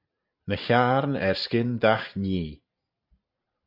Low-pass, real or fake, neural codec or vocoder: 5.4 kHz; fake; vocoder, 44.1 kHz, 128 mel bands every 512 samples, BigVGAN v2